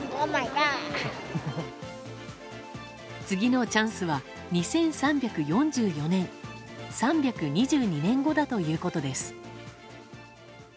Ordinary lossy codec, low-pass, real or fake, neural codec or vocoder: none; none; real; none